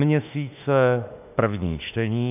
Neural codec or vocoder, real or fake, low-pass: autoencoder, 48 kHz, 32 numbers a frame, DAC-VAE, trained on Japanese speech; fake; 3.6 kHz